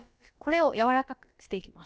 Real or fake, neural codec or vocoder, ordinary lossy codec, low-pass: fake; codec, 16 kHz, about 1 kbps, DyCAST, with the encoder's durations; none; none